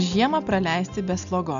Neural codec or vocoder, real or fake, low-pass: none; real; 7.2 kHz